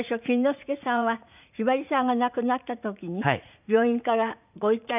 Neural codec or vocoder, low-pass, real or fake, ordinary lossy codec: vocoder, 44.1 kHz, 80 mel bands, Vocos; 3.6 kHz; fake; none